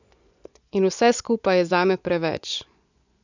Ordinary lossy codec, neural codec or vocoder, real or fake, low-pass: none; vocoder, 24 kHz, 100 mel bands, Vocos; fake; 7.2 kHz